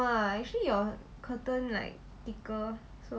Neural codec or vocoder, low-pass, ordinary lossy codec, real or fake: none; none; none; real